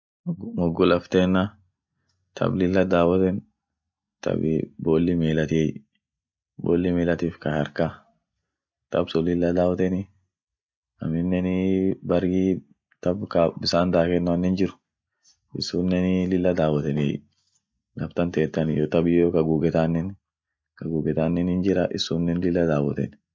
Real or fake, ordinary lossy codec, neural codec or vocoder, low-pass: real; none; none; none